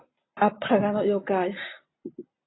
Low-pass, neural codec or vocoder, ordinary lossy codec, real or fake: 7.2 kHz; none; AAC, 16 kbps; real